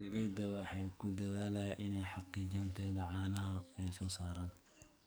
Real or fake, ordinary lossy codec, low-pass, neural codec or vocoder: fake; none; none; codec, 44.1 kHz, 2.6 kbps, SNAC